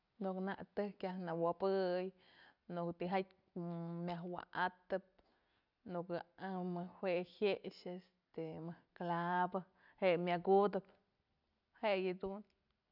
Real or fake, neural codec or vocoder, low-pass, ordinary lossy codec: real; none; 5.4 kHz; none